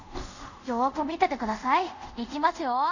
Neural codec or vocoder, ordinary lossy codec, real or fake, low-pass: codec, 24 kHz, 0.5 kbps, DualCodec; none; fake; 7.2 kHz